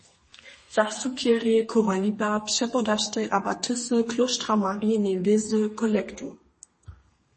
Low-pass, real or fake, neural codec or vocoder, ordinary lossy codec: 10.8 kHz; fake; codec, 32 kHz, 1.9 kbps, SNAC; MP3, 32 kbps